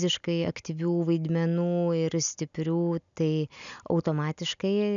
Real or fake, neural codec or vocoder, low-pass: real; none; 7.2 kHz